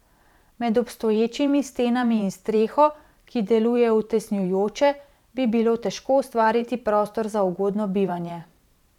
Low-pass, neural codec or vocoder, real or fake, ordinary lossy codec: 19.8 kHz; vocoder, 44.1 kHz, 128 mel bands every 512 samples, BigVGAN v2; fake; none